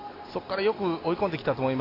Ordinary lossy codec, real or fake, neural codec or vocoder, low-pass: AAC, 24 kbps; real; none; 5.4 kHz